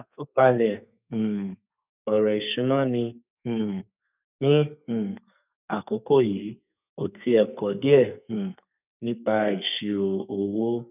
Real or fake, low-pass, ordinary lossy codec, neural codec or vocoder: fake; 3.6 kHz; none; codec, 32 kHz, 1.9 kbps, SNAC